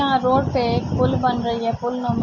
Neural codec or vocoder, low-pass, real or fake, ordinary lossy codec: none; 7.2 kHz; real; MP3, 32 kbps